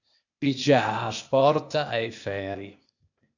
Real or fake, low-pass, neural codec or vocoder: fake; 7.2 kHz; codec, 16 kHz, 0.8 kbps, ZipCodec